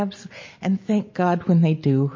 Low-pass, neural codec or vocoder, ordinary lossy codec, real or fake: 7.2 kHz; none; MP3, 32 kbps; real